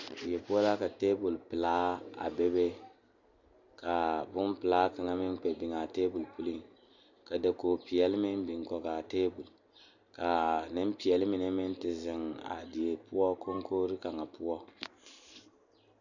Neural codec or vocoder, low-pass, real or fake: none; 7.2 kHz; real